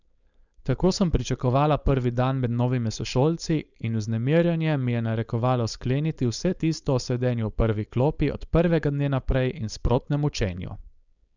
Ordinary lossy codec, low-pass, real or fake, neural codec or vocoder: none; 7.2 kHz; fake; codec, 16 kHz, 4.8 kbps, FACodec